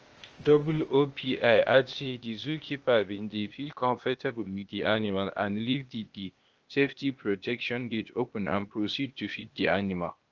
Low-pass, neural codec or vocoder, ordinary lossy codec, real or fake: 7.2 kHz; codec, 16 kHz, 0.8 kbps, ZipCodec; Opus, 24 kbps; fake